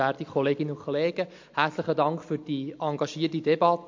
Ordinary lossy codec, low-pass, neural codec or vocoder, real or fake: MP3, 48 kbps; 7.2 kHz; none; real